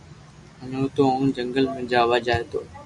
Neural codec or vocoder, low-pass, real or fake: none; 10.8 kHz; real